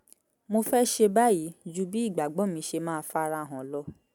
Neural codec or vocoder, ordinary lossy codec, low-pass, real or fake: none; none; none; real